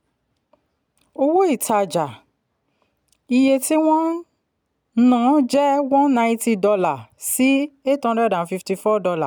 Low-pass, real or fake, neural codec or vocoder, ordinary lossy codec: none; real; none; none